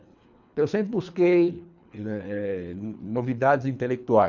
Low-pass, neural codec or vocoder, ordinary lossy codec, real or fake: 7.2 kHz; codec, 24 kHz, 3 kbps, HILCodec; none; fake